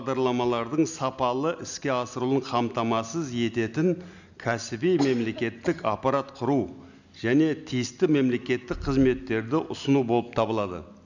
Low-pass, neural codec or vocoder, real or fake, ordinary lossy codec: 7.2 kHz; none; real; none